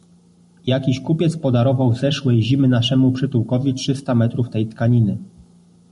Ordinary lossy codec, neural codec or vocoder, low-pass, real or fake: MP3, 48 kbps; none; 14.4 kHz; real